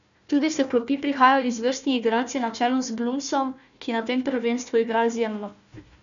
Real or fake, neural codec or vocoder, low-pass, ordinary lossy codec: fake; codec, 16 kHz, 1 kbps, FunCodec, trained on Chinese and English, 50 frames a second; 7.2 kHz; none